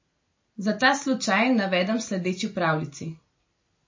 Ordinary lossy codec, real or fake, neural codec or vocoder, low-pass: MP3, 32 kbps; real; none; 7.2 kHz